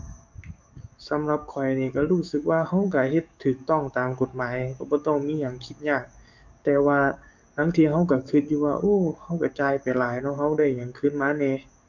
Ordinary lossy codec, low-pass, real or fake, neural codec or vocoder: none; 7.2 kHz; fake; codec, 44.1 kHz, 7.8 kbps, DAC